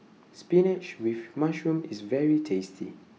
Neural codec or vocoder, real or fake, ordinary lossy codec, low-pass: none; real; none; none